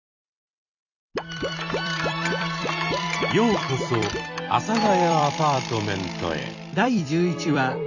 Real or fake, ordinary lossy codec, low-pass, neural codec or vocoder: fake; none; 7.2 kHz; vocoder, 44.1 kHz, 128 mel bands every 256 samples, BigVGAN v2